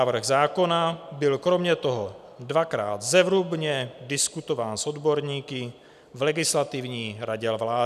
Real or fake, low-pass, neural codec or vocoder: real; 14.4 kHz; none